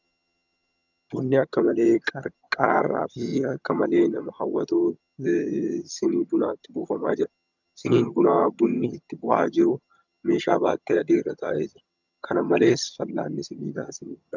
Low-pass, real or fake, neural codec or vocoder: 7.2 kHz; fake; vocoder, 22.05 kHz, 80 mel bands, HiFi-GAN